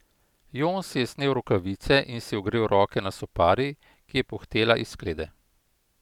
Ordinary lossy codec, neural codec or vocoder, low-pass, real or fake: none; none; 19.8 kHz; real